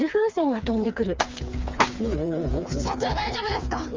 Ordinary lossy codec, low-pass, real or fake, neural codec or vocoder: Opus, 32 kbps; 7.2 kHz; fake; codec, 16 kHz, 4 kbps, FreqCodec, smaller model